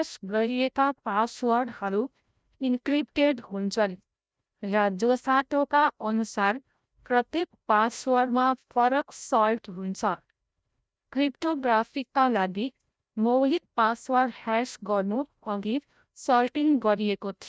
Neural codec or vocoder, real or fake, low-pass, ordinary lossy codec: codec, 16 kHz, 0.5 kbps, FreqCodec, larger model; fake; none; none